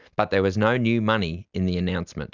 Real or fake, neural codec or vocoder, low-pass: real; none; 7.2 kHz